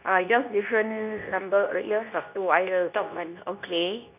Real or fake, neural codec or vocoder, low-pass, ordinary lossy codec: fake; codec, 24 kHz, 0.9 kbps, WavTokenizer, medium speech release version 2; 3.6 kHz; none